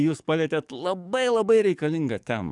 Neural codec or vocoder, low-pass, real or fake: codec, 44.1 kHz, 7.8 kbps, DAC; 10.8 kHz; fake